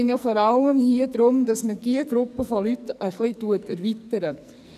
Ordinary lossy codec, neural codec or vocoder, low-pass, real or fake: none; codec, 44.1 kHz, 2.6 kbps, SNAC; 14.4 kHz; fake